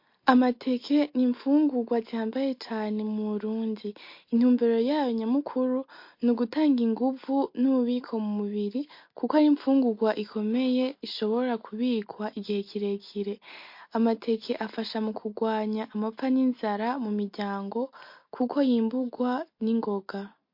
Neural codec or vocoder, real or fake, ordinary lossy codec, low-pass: none; real; MP3, 32 kbps; 5.4 kHz